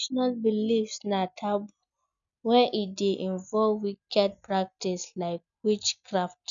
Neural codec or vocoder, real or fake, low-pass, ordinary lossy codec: none; real; 7.2 kHz; none